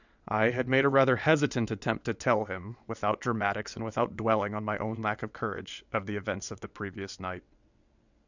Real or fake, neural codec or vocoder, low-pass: fake; vocoder, 22.05 kHz, 80 mel bands, WaveNeXt; 7.2 kHz